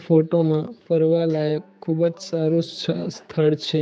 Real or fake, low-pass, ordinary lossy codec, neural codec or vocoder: fake; none; none; codec, 16 kHz, 4 kbps, X-Codec, HuBERT features, trained on general audio